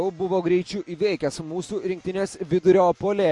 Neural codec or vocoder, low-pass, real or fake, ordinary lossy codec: none; 10.8 kHz; real; MP3, 48 kbps